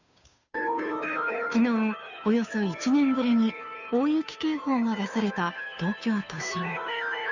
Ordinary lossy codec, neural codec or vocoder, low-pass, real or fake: none; codec, 16 kHz, 2 kbps, FunCodec, trained on Chinese and English, 25 frames a second; 7.2 kHz; fake